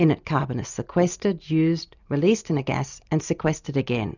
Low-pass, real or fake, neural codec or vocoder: 7.2 kHz; real; none